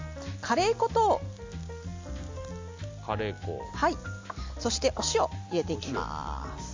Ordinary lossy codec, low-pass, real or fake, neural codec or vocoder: MP3, 64 kbps; 7.2 kHz; real; none